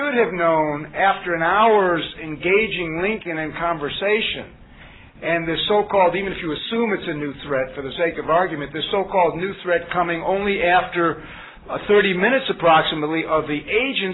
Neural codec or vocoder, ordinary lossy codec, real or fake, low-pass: none; AAC, 16 kbps; real; 7.2 kHz